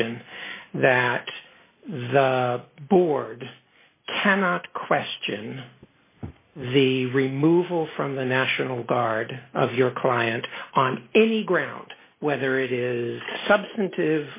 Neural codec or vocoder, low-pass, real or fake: none; 3.6 kHz; real